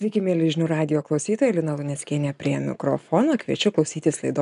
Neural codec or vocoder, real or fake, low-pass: none; real; 10.8 kHz